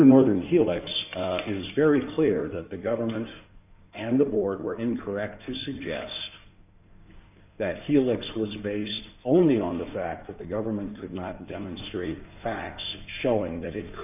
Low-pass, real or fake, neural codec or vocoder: 3.6 kHz; fake; codec, 16 kHz in and 24 kHz out, 2.2 kbps, FireRedTTS-2 codec